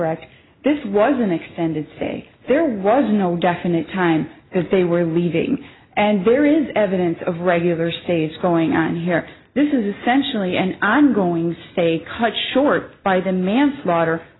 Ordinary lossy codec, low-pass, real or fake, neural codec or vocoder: AAC, 16 kbps; 7.2 kHz; real; none